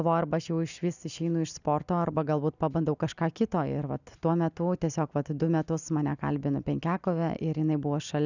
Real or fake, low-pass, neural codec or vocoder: real; 7.2 kHz; none